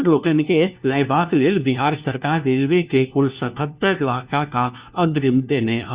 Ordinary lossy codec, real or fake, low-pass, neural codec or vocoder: Opus, 64 kbps; fake; 3.6 kHz; codec, 16 kHz, 1 kbps, FunCodec, trained on LibriTTS, 50 frames a second